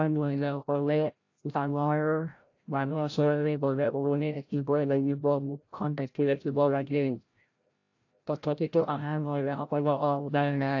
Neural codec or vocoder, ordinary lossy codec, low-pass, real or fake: codec, 16 kHz, 0.5 kbps, FreqCodec, larger model; AAC, 48 kbps; 7.2 kHz; fake